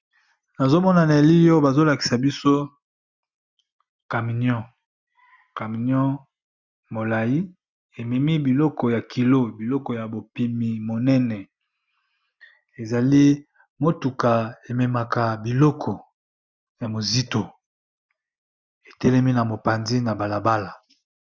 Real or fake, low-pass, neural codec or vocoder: real; 7.2 kHz; none